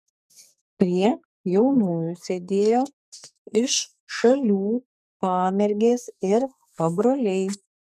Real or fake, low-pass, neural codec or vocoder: fake; 14.4 kHz; codec, 44.1 kHz, 2.6 kbps, SNAC